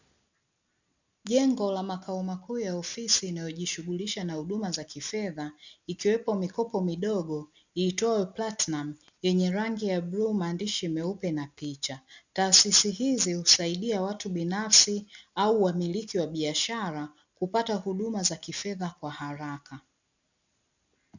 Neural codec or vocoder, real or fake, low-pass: none; real; 7.2 kHz